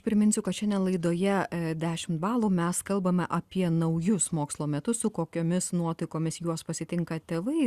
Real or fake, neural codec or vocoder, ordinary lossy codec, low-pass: real; none; Opus, 64 kbps; 14.4 kHz